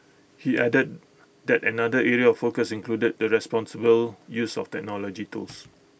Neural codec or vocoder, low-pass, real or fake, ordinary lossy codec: none; none; real; none